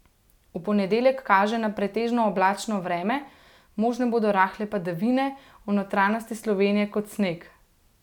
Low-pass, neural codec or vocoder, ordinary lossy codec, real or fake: 19.8 kHz; none; none; real